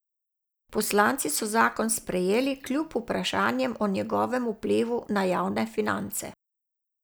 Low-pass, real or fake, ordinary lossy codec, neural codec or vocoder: none; real; none; none